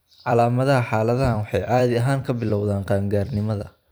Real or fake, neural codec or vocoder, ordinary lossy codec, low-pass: fake; vocoder, 44.1 kHz, 128 mel bands every 256 samples, BigVGAN v2; none; none